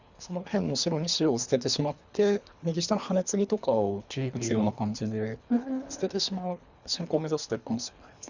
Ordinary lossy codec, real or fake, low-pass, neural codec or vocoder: Opus, 64 kbps; fake; 7.2 kHz; codec, 24 kHz, 3 kbps, HILCodec